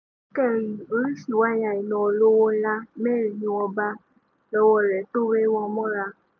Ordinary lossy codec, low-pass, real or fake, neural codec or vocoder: none; none; real; none